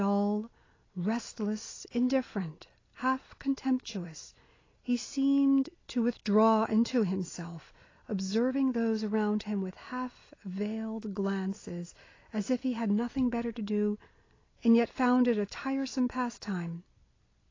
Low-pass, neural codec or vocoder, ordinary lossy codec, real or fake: 7.2 kHz; none; AAC, 32 kbps; real